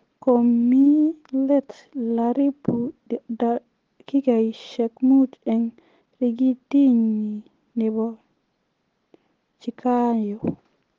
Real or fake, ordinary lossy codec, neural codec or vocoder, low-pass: real; Opus, 16 kbps; none; 7.2 kHz